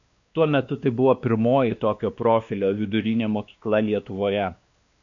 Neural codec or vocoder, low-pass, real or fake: codec, 16 kHz, 2 kbps, X-Codec, WavLM features, trained on Multilingual LibriSpeech; 7.2 kHz; fake